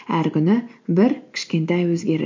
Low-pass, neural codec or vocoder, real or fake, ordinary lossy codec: 7.2 kHz; none; real; MP3, 48 kbps